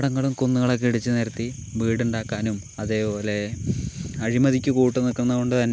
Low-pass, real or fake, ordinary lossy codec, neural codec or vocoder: none; real; none; none